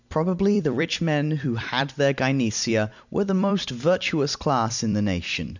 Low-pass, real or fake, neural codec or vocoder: 7.2 kHz; fake; vocoder, 44.1 kHz, 128 mel bands every 256 samples, BigVGAN v2